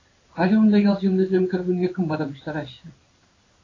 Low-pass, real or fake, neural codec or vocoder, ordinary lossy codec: 7.2 kHz; fake; codec, 16 kHz in and 24 kHz out, 1 kbps, XY-Tokenizer; AAC, 32 kbps